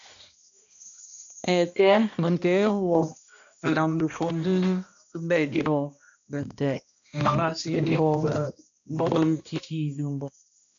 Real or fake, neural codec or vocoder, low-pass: fake; codec, 16 kHz, 1 kbps, X-Codec, HuBERT features, trained on balanced general audio; 7.2 kHz